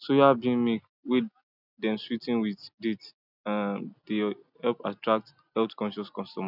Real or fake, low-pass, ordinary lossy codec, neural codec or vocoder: real; 5.4 kHz; none; none